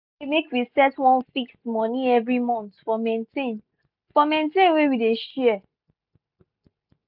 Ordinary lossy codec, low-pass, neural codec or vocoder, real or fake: none; 5.4 kHz; none; real